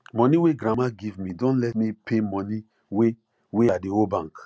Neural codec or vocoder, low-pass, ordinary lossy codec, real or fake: none; none; none; real